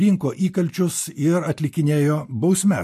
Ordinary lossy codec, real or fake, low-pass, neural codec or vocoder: MP3, 64 kbps; real; 14.4 kHz; none